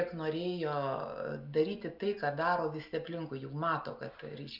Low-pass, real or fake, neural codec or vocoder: 5.4 kHz; real; none